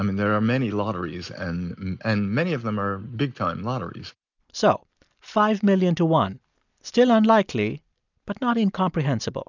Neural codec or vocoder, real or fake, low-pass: none; real; 7.2 kHz